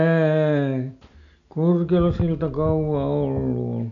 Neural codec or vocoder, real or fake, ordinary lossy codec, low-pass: none; real; none; 7.2 kHz